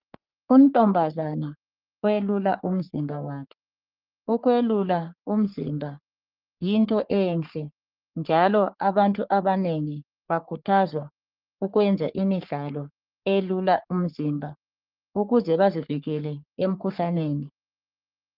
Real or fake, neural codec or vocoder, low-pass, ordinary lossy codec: fake; codec, 44.1 kHz, 3.4 kbps, Pupu-Codec; 5.4 kHz; Opus, 24 kbps